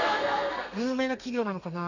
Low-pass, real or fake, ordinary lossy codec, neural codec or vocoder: 7.2 kHz; fake; none; codec, 32 kHz, 1.9 kbps, SNAC